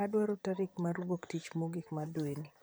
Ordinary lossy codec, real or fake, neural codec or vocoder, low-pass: none; fake; vocoder, 44.1 kHz, 128 mel bands, Pupu-Vocoder; none